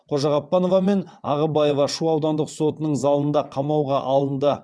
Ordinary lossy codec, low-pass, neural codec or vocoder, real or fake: none; none; vocoder, 22.05 kHz, 80 mel bands, WaveNeXt; fake